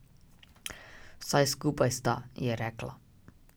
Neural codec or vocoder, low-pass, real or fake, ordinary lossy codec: none; none; real; none